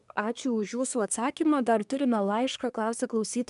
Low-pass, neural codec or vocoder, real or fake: 10.8 kHz; codec, 24 kHz, 1 kbps, SNAC; fake